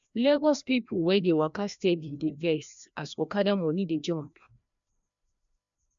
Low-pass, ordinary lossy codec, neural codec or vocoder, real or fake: 7.2 kHz; MP3, 64 kbps; codec, 16 kHz, 1 kbps, FreqCodec, larger model; fake